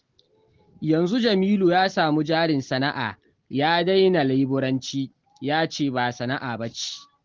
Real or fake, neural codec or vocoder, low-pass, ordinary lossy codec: real; none; 7.2 kHz; Opus, 16 kbps